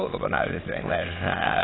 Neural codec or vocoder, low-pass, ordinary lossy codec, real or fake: autoencoder, 22.05 kHz, a latent of 192 numbers a frame, VITS, trained on many speakers; 7.2 kHz; AAC, 16 kbps; fake